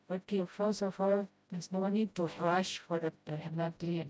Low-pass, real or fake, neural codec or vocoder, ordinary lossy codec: none; fake; codec, 16 kHz, 0.5 kbps, FreqCodec, smaller model; none